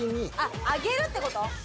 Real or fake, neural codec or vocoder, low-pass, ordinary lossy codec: real; none; none; none